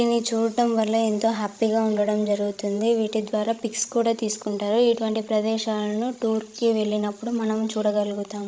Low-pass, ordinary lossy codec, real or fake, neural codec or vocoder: none; none; fake; codec, 16 kHz, 16 kbps, FreqCodec, larger model